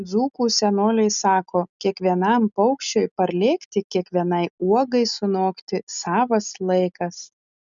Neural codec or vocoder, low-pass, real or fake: none; 7.2 kHz; real